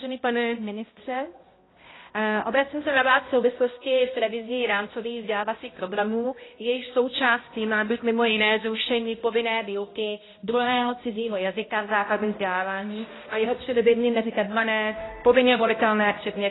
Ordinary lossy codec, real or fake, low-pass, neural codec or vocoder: AAC, 16 kbps; fake; 7.2 kHz; codec, 16 kHz, 0.5 kbps, X-Codec, HuBERT features, trained on balanced general audio